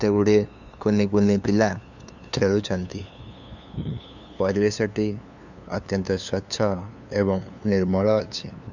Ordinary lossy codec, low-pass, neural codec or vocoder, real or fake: none; 7.2 kHz; codec, 16 kHz, 2 kbps, FunCodec, trained on LibriTTS, 25 frames a second; fake